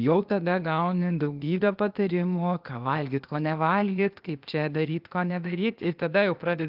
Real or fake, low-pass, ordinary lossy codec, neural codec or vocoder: fake; 5.4 kHz; Opus, 32 kbps; codec, 16 kHz, 0.8 kbps, ZipCodec